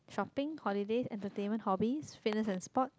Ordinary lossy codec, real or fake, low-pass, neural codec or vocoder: none; real; none; none